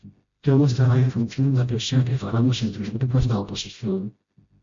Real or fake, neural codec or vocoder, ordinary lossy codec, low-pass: fake; codec, 16 kHz, 0.5 kbps, FreqCodec, smaller model; MP3, 48 kbps; 7.2 kHz